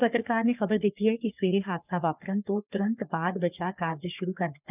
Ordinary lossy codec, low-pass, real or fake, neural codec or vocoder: none; 3.6 kHz; fake; codec, 44.1 kHz, 3.4 kbps, Pupu-Codec